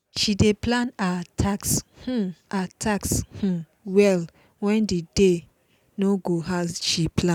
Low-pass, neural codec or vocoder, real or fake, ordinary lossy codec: 19.8 kHz; none; real; none